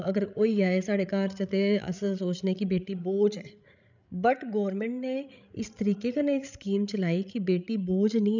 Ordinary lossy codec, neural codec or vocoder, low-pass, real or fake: none; codec, 16 kHz, 16 kbps, FreqCodec, larger model; 7.2 kHz; fake